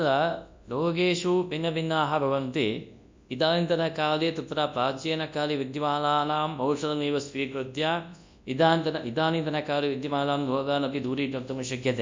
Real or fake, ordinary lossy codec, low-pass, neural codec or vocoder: fake; MP3, 64 kbps; 7.2 kHz; codec, 24 kHz, 0.9 kbps, WavTokenizer, large speech release